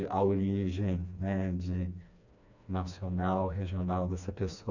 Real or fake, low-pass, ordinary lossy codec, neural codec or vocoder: fake; 7.2 kHz; none; codec, 16 kHz, 2 kbps, FreqCodec, smaller model